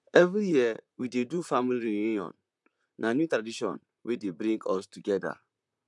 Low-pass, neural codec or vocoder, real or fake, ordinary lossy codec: 10.8 kHz; vocoder, 44.1 kHz, 128 mel bands, Pupu-Vocoder; fake; MP3, 96 kbps